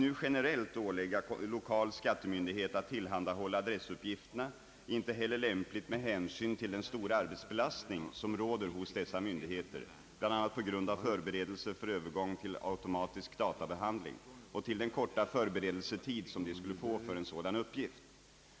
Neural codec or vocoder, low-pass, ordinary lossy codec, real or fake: none; none; none; real